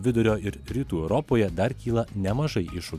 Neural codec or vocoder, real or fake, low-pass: none; real; 14.4 kHz